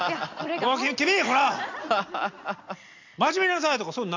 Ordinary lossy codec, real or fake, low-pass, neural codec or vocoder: AAC, 48 kbps; real; 7.2 kHz; none